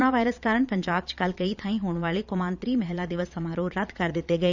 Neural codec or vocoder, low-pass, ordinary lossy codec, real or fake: vocoder, 22.05 kHz, 80 mel bands, Vocos; 7.2 kHz; none; fake